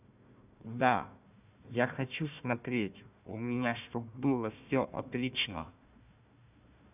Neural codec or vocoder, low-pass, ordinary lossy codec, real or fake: codec, 16 kHz, 1 kbps, FunCodec, trained on Chinese and English, 50 frames a second; 3.6 kHz; none; fake